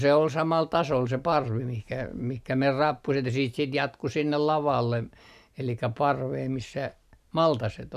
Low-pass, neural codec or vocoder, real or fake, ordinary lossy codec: 14.4 kHz; none; real; none